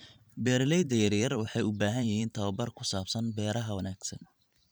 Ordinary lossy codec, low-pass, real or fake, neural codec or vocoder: none; none; fake; vocoder, 44.1 kHz, 128 mel bands every 512 samples, BigVGAN v2